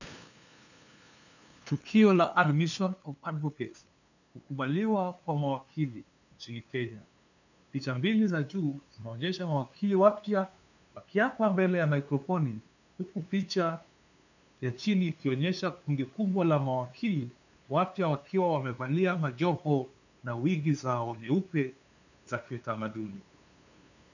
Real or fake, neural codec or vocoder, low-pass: fake; codec, 16 kHz, 2 kbps, FunCodec, trained on LibriTTS, 25 frames a second; 7.2 kHz